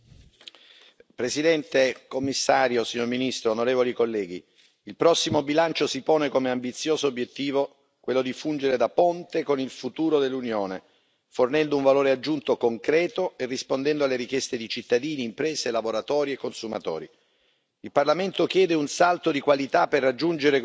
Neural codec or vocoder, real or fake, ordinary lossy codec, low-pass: none; real; none; none